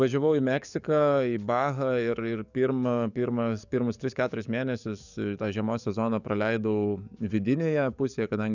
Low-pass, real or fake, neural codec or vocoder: 7.2 kHz; fake; codec, 44.1 kHz, 7.8 kbps, DAC